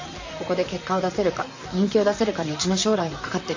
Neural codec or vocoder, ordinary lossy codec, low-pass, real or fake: vocoder, 44.1 kHz, 128 mel bands, Pupu-Vocoder; AAC, 48 kbps; 7.2 kHz; fake